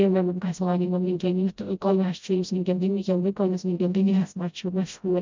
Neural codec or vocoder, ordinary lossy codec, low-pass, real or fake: codec, 16 kHz, 0.5 kbps, FreqCodec, smaller model; MP3, 64 kbps; 7.2 kHz; fake